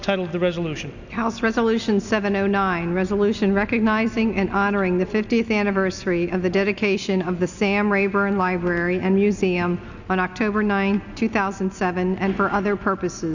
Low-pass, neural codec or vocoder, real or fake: 7.2 kHz; none; real